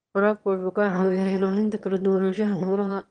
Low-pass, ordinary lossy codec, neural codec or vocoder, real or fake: 9.9 kHz; Opus, 24 kbps; autoencoder, 22.05 kHz, a latent of 192 numbers a frame, VITS, trained on one speaker; fake